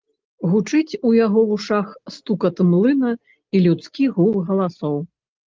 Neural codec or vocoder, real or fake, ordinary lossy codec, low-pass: none; real; Opus, 32 kbps; 7.2 kHz